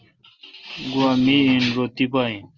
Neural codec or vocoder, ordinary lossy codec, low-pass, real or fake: none; Opus, 24 kbps; 7.2 kHz; real